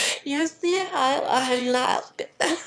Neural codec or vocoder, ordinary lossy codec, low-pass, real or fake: autoencoder, 22.05 kHz, a latent of 192 numbers a frame, VITS, trained on one speaker; none; none; fake